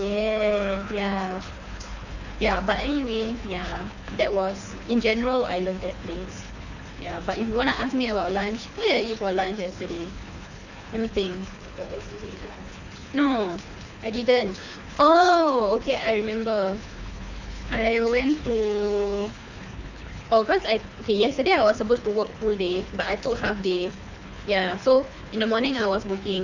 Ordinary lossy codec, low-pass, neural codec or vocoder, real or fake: none; 7.2 kHz; codec, 24 kHz, 3 kbps, HILCodec; fake